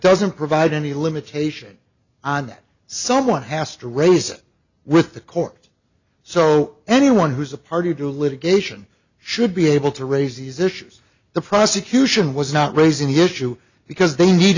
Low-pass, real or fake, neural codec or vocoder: 7.2 kHz; real; none